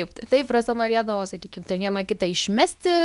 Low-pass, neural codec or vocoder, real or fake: 10.8 kHz; codec, 24 kHz, 0.9 kbps, WavTokenizer, medium speech release version 2; fake